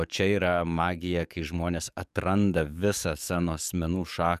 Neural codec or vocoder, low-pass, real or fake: codec, 44.1 kHz, 7.8 kbps, DAC; 14.4 kHz; fake